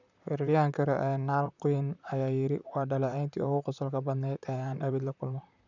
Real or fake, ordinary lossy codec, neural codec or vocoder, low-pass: fake; none; vocoder, 44.1 kHz, 128 mel bands, Pupu-Vocoder; 7.2 kHz